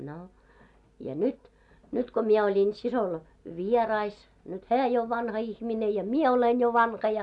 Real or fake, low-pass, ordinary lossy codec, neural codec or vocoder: real; 10.8 kHz; none; none